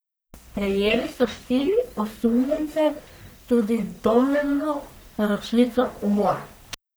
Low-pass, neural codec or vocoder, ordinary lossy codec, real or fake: none; codec, 44.1 kHz, 1.7 kbps, Pupu-Codec; none; fake